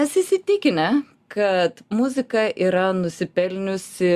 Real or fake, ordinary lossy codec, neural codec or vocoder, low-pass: real; Opus, 64 kbps; none; 14.4 kHz